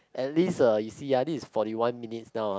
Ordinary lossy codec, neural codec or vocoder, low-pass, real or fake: none; none; none; real